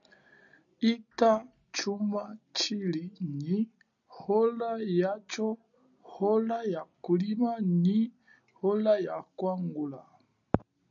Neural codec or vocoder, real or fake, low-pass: none; real; 7.2 kHz